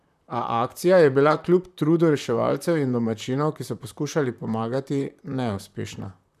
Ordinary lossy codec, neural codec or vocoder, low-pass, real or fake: none; vocoder, 44.1 kHz, 128 mel bands, Pupu-Vocoder; 14.4 kHz; fake